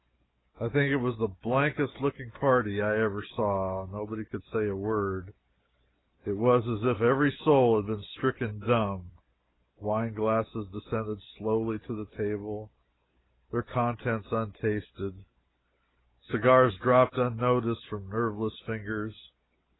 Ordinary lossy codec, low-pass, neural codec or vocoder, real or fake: AAC, 16 kbps; 7.2 kHz; none; real